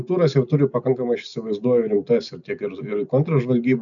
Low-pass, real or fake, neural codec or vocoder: 7.2 kHz; real; none